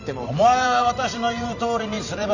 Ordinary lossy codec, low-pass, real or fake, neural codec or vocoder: none; 7.2 kHz; fake; vocoder, 44.1 kHz, 128 mel bands every 512 samples, BigVGAN v2